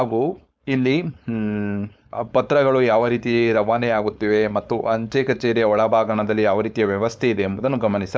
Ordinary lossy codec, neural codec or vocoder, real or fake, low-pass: none; codec, 16 kHz, 4.8 kbps, FACodec; fake; none